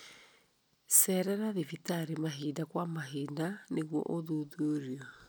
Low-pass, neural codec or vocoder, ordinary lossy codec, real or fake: none; none; none; real